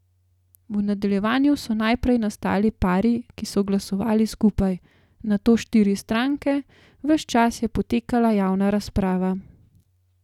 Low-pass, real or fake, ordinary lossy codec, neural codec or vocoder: 19.8 kHz; real; none; none